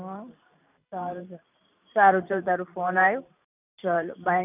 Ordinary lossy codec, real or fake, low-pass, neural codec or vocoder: none; fake; 3.6 kHz; vocoder, 44.1 kHz, 128 mel bands every 512 samples, BigVGAN v2